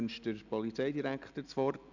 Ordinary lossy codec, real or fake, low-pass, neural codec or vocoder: none; real; 7.2 kHz; none